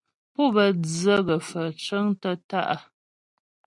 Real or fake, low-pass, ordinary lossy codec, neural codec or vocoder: real; 10.8 kHz; MP3, 96 kbps; none